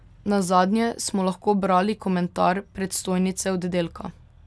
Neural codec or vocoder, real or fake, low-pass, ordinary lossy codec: none; real; none; none